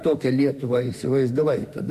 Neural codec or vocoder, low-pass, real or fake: autoencoder, 48 kHz, 32 numbers a frame, DAC-VAE, trained on Japanese speech; 14.4 kHz; fake